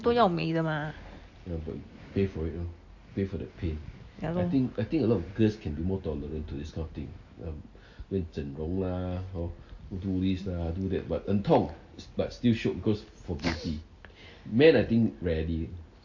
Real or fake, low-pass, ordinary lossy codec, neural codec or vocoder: real; 7.2 kHz; AAC, 48 kbps; none